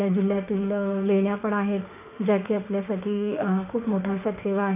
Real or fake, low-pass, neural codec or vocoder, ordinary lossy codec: fake; 3.6 kHz; autoencoder, 48 kHz, 32 numbers a frame, DAC-VAE, trained on Japanese speech; none